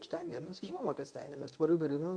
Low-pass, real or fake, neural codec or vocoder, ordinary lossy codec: 9.9 kHz; fake; codec, 24 kHz, 0.9 kbps, WavTokenizer, medium speech release version 1; MP3, 64 kbps